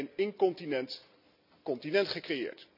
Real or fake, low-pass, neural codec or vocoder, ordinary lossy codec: real; 5.4 kHz; none; none